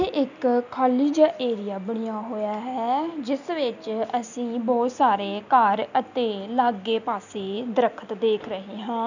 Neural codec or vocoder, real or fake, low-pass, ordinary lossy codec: none; real; 7.2 kHz; none